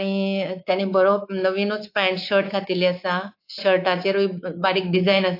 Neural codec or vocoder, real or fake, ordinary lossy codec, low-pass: codec, 24 kHz, 3.1 kbps, DualCodec; fake; AAC, 32 kbps; 5.4 kHz